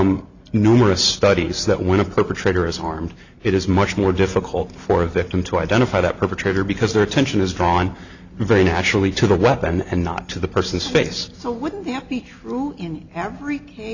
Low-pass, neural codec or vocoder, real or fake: 7.2 kHz; none; real